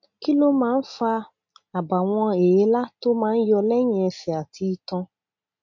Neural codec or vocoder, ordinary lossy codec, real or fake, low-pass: none; MP3, 48 kbps; real; 7.2 kHz